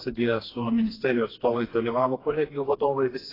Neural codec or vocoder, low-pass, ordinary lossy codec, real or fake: codec, 16 kHz, 2 kbps, FreqCodec, smaller model; 5.4 kHz; AAC, 24 kbps; fake